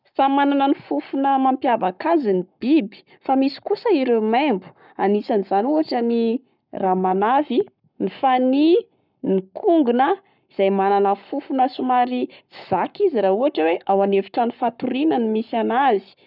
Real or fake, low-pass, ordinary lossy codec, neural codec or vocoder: fake; 5.4 kHz; none; codec, 44.1 kHz, 7.8 kbps, Pupu-Codec